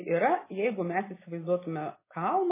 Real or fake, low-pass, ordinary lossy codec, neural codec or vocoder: real; 3.6 kHz; MP3, 16 kbps; none